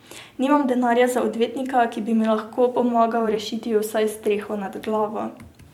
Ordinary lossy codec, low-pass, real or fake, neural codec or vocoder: MP3, 96 kbps; 19.8 kHz; fake; vocoder, 44.1 kHz, 128 mel bands every 256 samples, BigVGAN v2